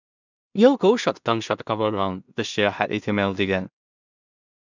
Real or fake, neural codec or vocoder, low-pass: fake; codec, 16 kHz in and 24 kHz out, 0.4 kbps, LongCat-Audio-Codec, two codebook decoder; 7.2 kHz